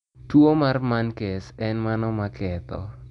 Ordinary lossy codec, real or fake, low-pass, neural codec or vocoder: none; real; 10.8 kHz; none